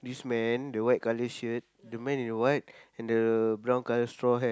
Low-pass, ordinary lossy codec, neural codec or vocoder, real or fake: none; none; none; real